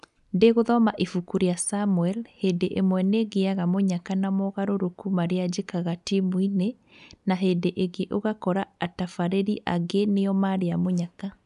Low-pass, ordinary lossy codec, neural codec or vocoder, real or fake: 10.8 kHz; none; none; real